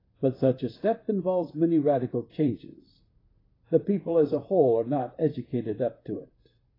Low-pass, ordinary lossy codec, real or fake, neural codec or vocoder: 5.4 kHz; AAC, 24 kbps; fake; vocoder, 44.1 kHz, 128 mel bands, Pupu-Vocoder